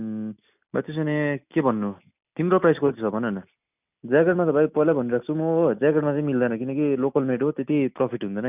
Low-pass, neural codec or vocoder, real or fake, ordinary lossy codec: 3.6 kHz; none; real; none